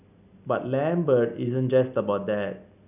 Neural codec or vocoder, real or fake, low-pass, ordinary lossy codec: none; real; 3.6 kHz; none